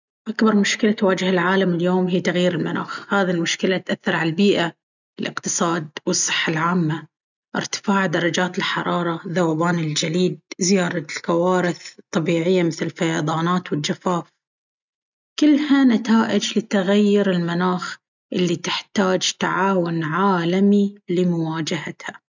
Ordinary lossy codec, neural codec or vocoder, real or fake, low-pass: none; none; real; 7.2 kHz